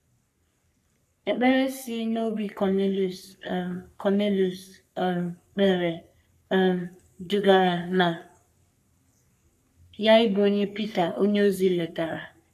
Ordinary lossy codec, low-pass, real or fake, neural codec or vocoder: none; 14.4 kHz; fake; codec, 44.1 kHz, 3.4 kbps, Pupu-Codec